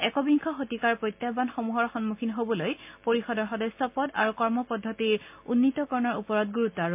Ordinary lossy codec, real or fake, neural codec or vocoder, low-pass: MP3, 32 kbps; real; none; 3.6 kHz